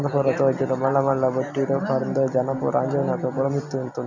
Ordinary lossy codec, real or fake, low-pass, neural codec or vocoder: none; real; 7.2 kHz; none